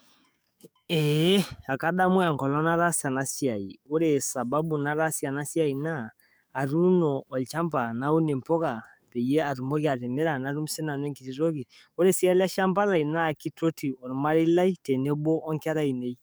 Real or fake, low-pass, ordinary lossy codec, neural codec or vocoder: fake; none; none; codec, 44.1 kHz, 7.8 kbps, DAC